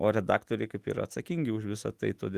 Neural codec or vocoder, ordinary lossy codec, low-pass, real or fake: autoencoder, 48 kHz, 128 numbers a frame, DAC-VAE, trained on Japanese speech; Opus, 24 kbps; 14.4 kHz; fake